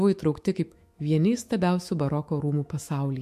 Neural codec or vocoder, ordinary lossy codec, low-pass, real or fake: autoencoder, 48 kHz, 128 numbers a frame, DAC-VAE, trained on Japanese speech; MP3, 64 kbps; 14.4 kHz; fake